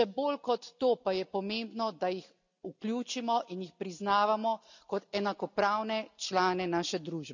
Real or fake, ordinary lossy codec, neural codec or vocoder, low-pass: real; none; none; 7.2 kHz